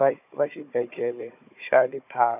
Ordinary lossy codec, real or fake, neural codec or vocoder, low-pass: none; fake; codec, 16 kHz, 4 kbps, FunCodec, trained on Chinese and English, 50 frames a second; 3.6 kHz